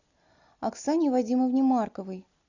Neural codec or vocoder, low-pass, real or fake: none; 7.2 kHz; real